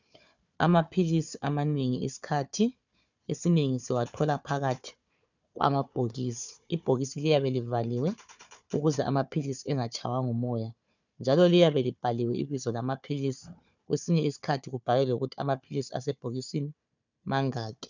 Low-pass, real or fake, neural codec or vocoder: 7.2 kHz; fake; codec, 16 kHz, 4 kbps, FunCodec, trained on Chinese and English, 50 frames a second